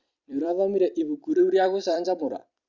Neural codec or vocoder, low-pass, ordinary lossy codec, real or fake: vocoder, 44.1 kHz, 128 mel bands every 256 samples, BigVGAN v2; 7.2 kHz; Opus, 64 kbps; fake